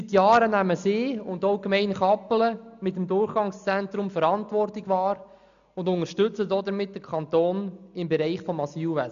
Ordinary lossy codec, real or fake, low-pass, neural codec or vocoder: none; real; 7.2 kHz; none